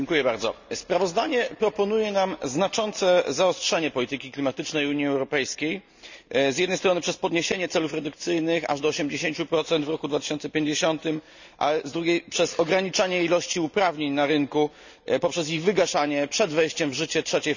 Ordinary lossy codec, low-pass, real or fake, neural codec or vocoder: none; 7.2 kHz; real; none